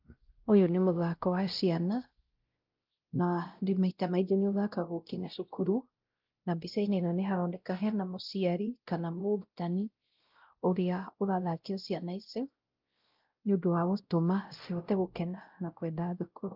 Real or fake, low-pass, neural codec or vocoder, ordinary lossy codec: fake; 5.4 kHz; codec, 16 kHz, 0.5 kbps, X-Codec, WavLM features, trained on Multilingual LibriSpeech; Opus, 32 kbps